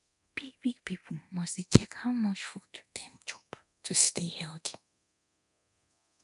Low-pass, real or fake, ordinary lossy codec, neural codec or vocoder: 10.8 kHz; fake; none; codec, 24 kHz, 0.9 kbps, DualCodec